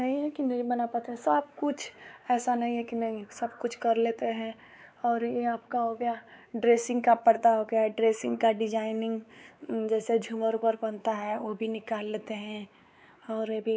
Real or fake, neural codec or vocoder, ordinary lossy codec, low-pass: fake; codec, 16 kHz, 4 kbps, X-Codec, WavLM features, trained on Multilingual LibriSpeech; none; none